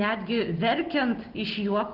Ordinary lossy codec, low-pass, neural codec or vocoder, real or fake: Opus, 16 kbps; 5.4 kHz; none; real